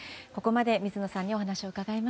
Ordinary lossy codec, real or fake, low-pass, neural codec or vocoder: none; real; none; none